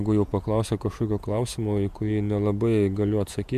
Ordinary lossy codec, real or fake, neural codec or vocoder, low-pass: MP3, 96 kbps; fake; vocoder, 48 kHz, 128 mel bands, Vocos; 14.4 kHz